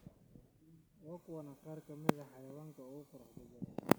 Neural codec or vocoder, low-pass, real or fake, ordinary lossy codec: none; none; real; none